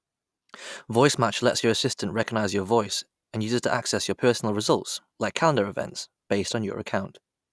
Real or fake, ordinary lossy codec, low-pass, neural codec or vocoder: real; none; none; none